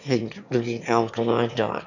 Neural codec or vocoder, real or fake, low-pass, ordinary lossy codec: autoencoder, 22.05 kHz, a latent of 192 numbers a frame, VITS, trained on one speaker; fake; 7.2 kHz; MP3, 64 kbps